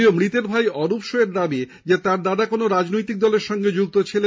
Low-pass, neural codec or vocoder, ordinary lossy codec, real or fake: none; none; none; real